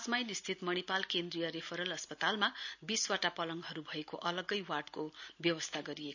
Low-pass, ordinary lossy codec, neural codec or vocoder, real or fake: 7.2 kHz; none; none; real